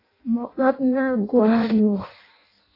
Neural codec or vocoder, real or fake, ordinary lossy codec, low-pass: codec, 16 kHz in and 24 kHz out, 0.6 kbps, FireRedTTS-2 codec; fake; AAC, 24 kbps; 5.4 kHz